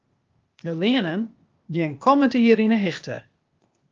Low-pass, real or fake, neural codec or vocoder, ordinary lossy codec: 7.2 kHz; fake; codec, 16 kHz, 0.8 kbps, ZipCodec; Opus, 24 kbps